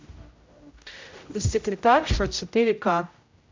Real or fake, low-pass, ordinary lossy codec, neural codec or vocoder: fake; 7.2 kHz; MP3, 64 kbps; codec, 16 kHz, 0.5 kbps, X-Codec, HuBERT features, trained on general audio